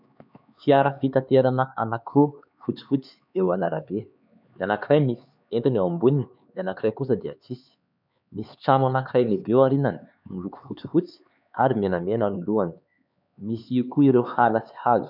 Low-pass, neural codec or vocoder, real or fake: 5.4 kHz; codec, 16 kHz, 4 kbps, X-Codec, HuBERT features, trained on LibriSpeech; fake